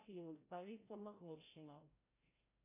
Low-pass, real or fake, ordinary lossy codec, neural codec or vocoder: 3.6 kHz; fake; AAC, 24 kbps; codec, 16 kHz, 0.5 kbps, FreqCodec, larger model